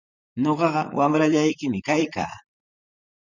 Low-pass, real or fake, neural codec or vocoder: 7.2 kHz; fake; vocoder, 44.1 kHz, 128 mel bands, Pupu-Vocoder